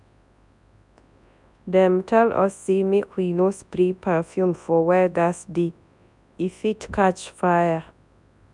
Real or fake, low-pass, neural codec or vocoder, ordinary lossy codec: fake; 10.8 kHz; codec, 24 kHz, 0.9 kbps, WavTokenizer, large speech release; none